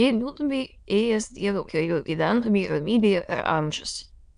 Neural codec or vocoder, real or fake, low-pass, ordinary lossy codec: autoencoder, 22.05 kHz, a latent of 192 numbers a frame, VITS, trained on many speakers; fake; 9.9 kHz; Opus, 64 kbps